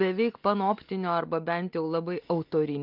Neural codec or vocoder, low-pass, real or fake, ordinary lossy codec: none; 5.4 kHz; real; Opus, 24 kbps